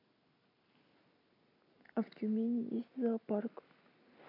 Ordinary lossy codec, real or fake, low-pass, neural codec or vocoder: MP3, 48 kbps; real; 5.4 kHz; none